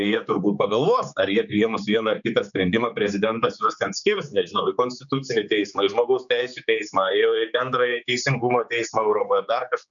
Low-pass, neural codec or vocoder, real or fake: 7.2 kHz; codec, 16 kHz, 4 kbps, X-Codec, HuBERT features, trained on general audio; fake